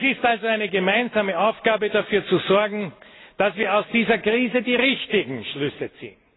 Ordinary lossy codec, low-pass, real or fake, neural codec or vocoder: AAC, 16 kbps; 7.2 kHz; real; none